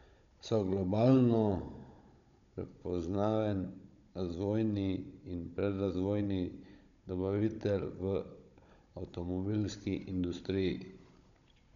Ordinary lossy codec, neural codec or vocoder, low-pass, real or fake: none; codec, 16 kHz, 16 kbps, FunCodec, trained on Chinese and English, 50 frames a second; 7.2 kHz; fake